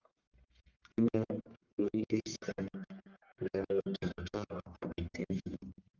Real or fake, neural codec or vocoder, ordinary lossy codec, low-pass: fake; codec, 44.1 kHz, 1.7 kbps, Pupu-Codec; Opus, 24 kbps; 7.2 kHz